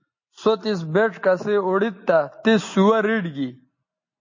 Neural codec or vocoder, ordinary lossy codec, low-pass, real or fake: none; MP3, 32 kbps; 7.2 kHz; real